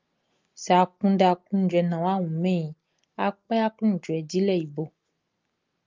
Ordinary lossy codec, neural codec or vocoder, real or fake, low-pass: Opus, 32 kbps; none; real; 7.2 kHz